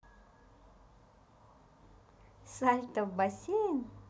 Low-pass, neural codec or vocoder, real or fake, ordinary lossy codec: none; none; real; none